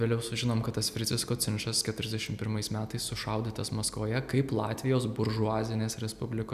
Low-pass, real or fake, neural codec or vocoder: 14.4 kHz; real; none